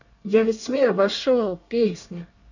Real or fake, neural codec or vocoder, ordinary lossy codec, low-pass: fake; codec, 24 kHz, 1 kbps, SNAC; none; 7.2 kHz